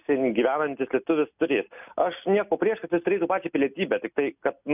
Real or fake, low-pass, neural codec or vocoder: real; 3.6 kHz; none